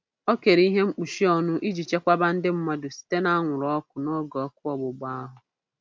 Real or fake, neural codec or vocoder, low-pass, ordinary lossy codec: real; none; none; none